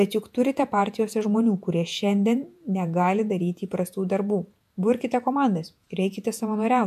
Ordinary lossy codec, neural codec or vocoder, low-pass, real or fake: AAC, 96 kbps; vocoder, 48 kHz, 128 mel bands, Vocos; 14.4 kHz; fake